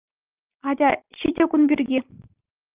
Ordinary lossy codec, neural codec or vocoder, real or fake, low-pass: Opus, 24 kbps; none; real; 3.6 kHz